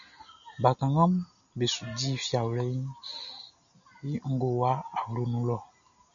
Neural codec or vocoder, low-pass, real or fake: none; 7.2 kHz; real